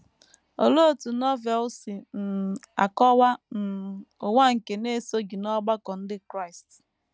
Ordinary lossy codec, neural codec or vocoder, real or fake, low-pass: none; none; real; none